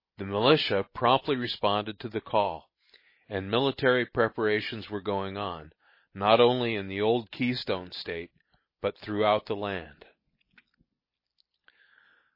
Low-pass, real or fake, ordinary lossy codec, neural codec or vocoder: 5.4 kHz; real; MP3, 24 kbps; none